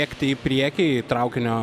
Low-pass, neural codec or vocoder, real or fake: 14.4 kHz; none; real